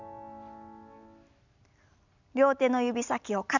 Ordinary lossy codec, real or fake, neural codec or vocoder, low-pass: none; real; none; 7.2 kHz